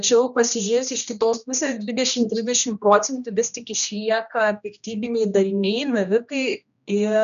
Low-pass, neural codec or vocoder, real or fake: 7.2 kHz; codec, 16 kHz, 1 kbps, X-Codec, HuBERT features, trained on general audio; fake